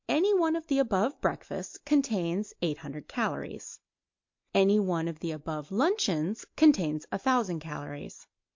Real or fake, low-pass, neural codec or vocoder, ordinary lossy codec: real; 7.2 kHz; none; MP3, 48 kbps